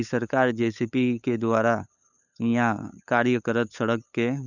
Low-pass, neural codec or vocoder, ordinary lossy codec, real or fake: 7.2 kHz; codec, 16 kHz, 8 kbps, FunCodec, trained on LibriTTS, 25 frames a second; none; fake